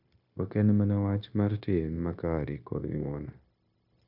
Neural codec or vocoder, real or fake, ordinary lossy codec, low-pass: codec, 16 kHz, 0.9 kbps, LongCat-Audio-Codec; fake; none; 5.4 kHz